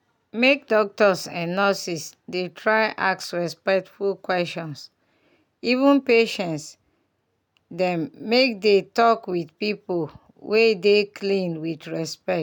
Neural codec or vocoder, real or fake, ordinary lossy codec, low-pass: none; real; none; 19.8 kHz